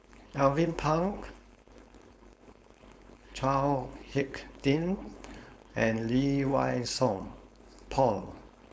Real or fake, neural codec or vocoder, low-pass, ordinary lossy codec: fake; codec, 16 kHz, 4.8 kbps, FACodec; none; none